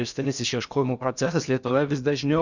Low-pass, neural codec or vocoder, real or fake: 7.2 kHz; codec, 16 kHz in and 24 kHz out, 0.6 kbps, FocalCodec, streaming, 2048 codes; fake